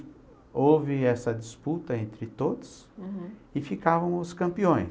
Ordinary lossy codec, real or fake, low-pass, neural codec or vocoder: none; real; none; none